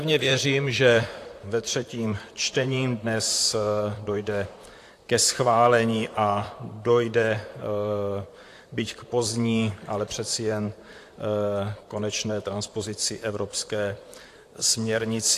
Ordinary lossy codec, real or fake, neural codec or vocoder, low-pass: AAC, 64 kbps; fake; vocoder, 44.1 kHz, 128 mel bands, Pupu-Vocoder; 14.4 kHz